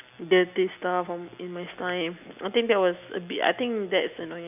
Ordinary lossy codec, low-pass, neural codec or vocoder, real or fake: none; 3.6 kHz; none; real